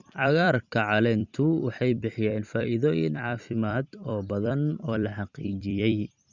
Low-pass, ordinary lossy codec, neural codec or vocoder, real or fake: 7.2 kHz; Opus, 64 kbps; vocoder, 44.1 kHz, 128 mel bands every 256 samples, BigVGAN v2; fake